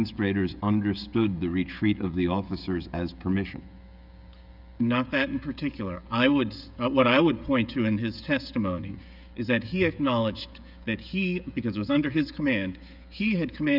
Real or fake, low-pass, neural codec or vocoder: fake; 5.4 kHz; codec, 16 kHz, 16 kbps, FreqCodec, smaller model